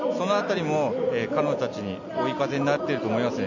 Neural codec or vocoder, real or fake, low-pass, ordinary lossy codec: none; real; 7.2 kHz; none